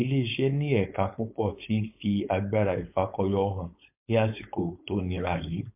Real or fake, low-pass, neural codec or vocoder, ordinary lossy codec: fake; 3.6 kHz; codec, 16 kHz, 4.8 kbps, FACodec; MP3, 32 kbps